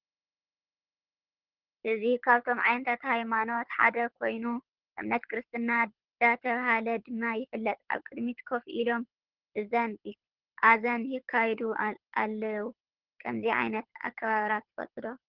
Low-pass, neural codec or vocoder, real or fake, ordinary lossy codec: 5.4 kHz; codec, 24 kHz, 6 kbps, HILCodec; fake; Opus, 16 kbps